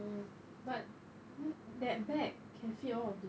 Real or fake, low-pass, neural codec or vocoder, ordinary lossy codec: real; none; none; none